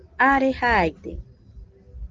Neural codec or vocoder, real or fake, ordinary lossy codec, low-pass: none; real; Opus, 16 kbps; 7.2 kHz